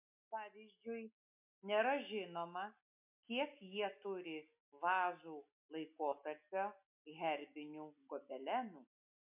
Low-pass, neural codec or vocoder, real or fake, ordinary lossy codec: 3.6 kHz; none; real; MP3, 32 kbps